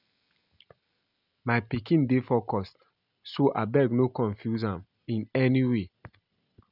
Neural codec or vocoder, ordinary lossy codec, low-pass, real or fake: none; none; 5.4 kHz; real